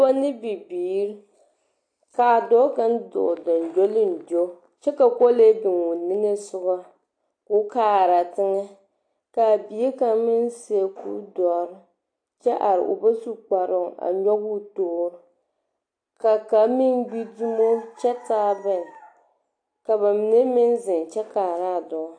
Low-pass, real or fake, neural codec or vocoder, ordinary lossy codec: 9.9 kHz; real; none; AAC, 48 kbps